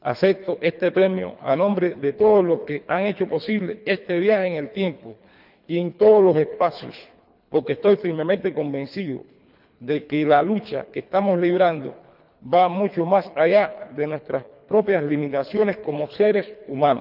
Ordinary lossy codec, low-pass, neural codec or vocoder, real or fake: none; 5.4 kHz; codec, 24 kHz, 3 kbps, HILCodec; fake